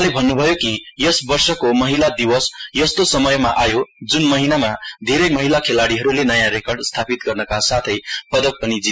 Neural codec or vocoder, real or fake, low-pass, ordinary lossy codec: none; real; none; none